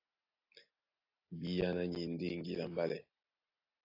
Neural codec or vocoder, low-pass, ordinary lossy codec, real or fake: none; 5.4 kHz; AAC, 32 kbps; real